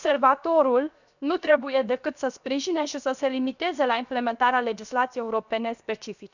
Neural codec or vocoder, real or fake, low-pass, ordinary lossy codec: codec, 16 kHz, 0.7 kbps, FocalCodec; fake; 7.2 kHz; none